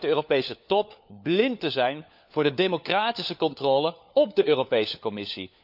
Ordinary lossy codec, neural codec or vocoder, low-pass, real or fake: none; codec, 16 kHz, 4 kbps, FunCodec, trained on LibriTTS, 50 frames a second; 5.4 kHz; fake